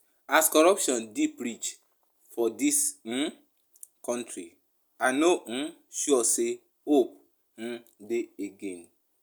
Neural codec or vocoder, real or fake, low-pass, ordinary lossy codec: none; real; none; none